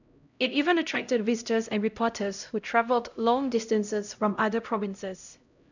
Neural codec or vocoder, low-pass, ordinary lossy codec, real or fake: codec, 16 kHz, 0.5 kbps, X-Codec, HuBERT features, trained on LibriSpeech; 7.2 kHz; none; fake